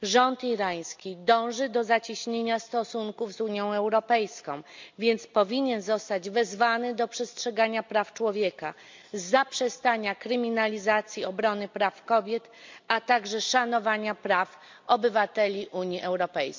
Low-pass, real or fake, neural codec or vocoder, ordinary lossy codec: 7.2 kHz; real; none; MP3, 64 kbps